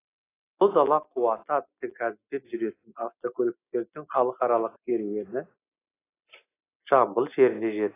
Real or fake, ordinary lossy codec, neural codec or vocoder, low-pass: real; AAC, 24 kbps; none; 3.6 kHz